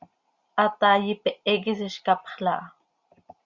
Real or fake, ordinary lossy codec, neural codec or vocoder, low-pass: real; Opus, 64 kbps; none; 7.2 kHz